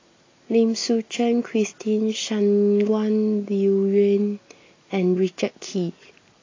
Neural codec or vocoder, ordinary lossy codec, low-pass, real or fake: none; AAC, 32 kbps; 7.2 kHz; real